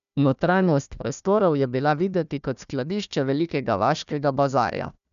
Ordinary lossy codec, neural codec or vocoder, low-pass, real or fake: none; codec, 16 kHz, 1 kbps, FunCodec, trained on Chinese and English, 50 frames a second; 7.2 kHz; fake